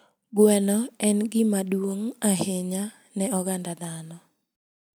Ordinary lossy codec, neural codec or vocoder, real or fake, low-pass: none; none; real; none